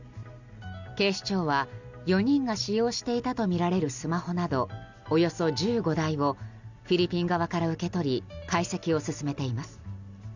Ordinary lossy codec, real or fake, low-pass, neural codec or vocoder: none; real; 7.2 kHz; none